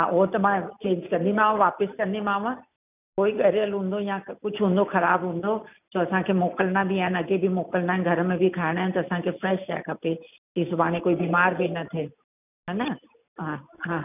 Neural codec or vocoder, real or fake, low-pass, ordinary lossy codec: none; real; 3.6 kHz; none